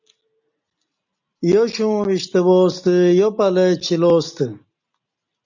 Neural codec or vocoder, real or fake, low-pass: none; real; 7.2 kHz